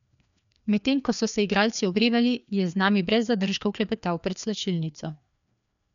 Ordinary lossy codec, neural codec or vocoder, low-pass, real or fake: none; codec, 16 kHz, 2 kbps, FreqCodec, larger model; 7.2 kHz; fake